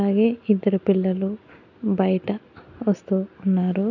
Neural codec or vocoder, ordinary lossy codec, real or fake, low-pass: none; none; real; 7.2 kHz